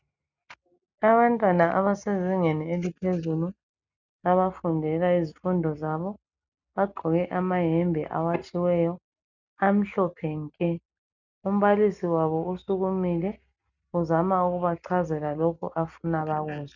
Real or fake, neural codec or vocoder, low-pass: real; none; 7.2 kHz